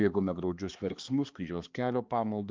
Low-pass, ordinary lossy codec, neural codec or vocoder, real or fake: 7.2 kHz; Opus, 24 kbps; codec, 16 kHz, 2 kbps, X-Codec, HuBERT features, trained on balanced general audio; fake